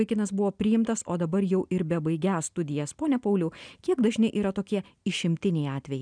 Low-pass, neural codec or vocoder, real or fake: 9.9 kHz; none; real